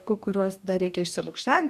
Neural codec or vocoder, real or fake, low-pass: codec, 44.1 kHz, 2.6 kbps, DAC; fake; 14.4 kHz